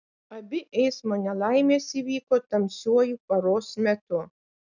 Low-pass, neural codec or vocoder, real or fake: 7.2 kHz; none; real